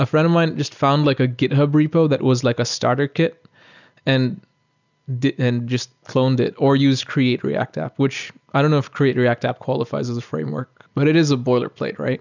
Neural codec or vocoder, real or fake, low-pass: none; real; 7.2 kHz